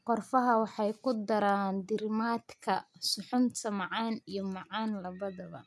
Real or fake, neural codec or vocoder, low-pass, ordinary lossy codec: real; none; none; none